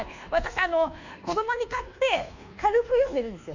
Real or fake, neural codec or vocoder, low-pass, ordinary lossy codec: fake; codec, 24 kHz, 1.2 kbps, DualCodec; 7.2 kHz; none